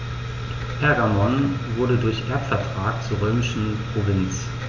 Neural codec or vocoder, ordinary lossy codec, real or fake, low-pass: none; none; real; 7.2 kHz